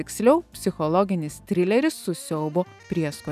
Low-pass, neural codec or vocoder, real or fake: 14.4 kHz; none; real